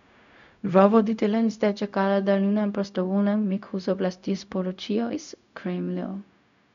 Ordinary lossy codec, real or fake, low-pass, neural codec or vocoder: none; fake; 7.2 kHz; codec, 16 kHz, 0.4 kbps, LongCat-Audio-Codec